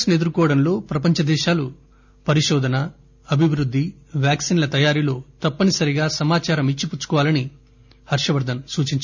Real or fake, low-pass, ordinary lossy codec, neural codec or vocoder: real; 7.2 kHz; none; none